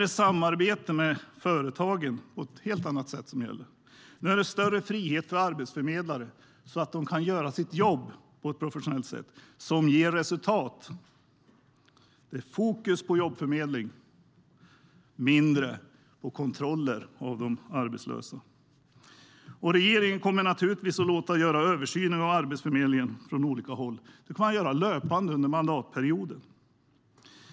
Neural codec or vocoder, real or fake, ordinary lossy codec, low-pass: none; real; none; none